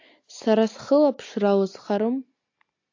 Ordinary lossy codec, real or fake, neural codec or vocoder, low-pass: AAC, 48 kbps; real; none; 7.2 kHz